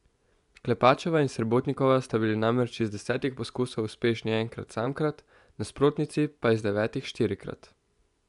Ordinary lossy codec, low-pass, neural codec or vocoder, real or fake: none; 10.8 kHz; vocoder, 24 kHz, 100 mel bands, Vocos; fake